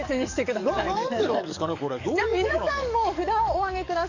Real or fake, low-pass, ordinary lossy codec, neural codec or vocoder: fake; 7.2 kHz; none; codec, 44.1 kHz, 7.8 kbps, DAC